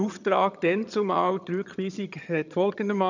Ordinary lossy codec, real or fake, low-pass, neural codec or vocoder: none; fake; 7.2 kHz; vocoder, 22.05 kHz, 80 mel bands, HiFi-GAN